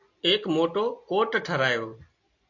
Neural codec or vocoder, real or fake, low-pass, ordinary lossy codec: none; real; 7.2 kHz; AAC, 48 kbps